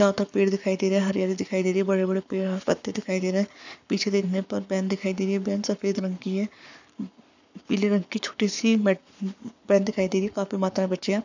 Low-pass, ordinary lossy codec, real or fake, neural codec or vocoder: 7.2 kHz; none; fake; codec, 44.1 kHz, 7.8 kbps, Pupu-Codec